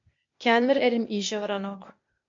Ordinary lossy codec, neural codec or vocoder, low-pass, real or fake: MP3, 48 kbps; codec, 16 kHz, 0.8 kbps, ZipCodec; 7.2 kHz; fake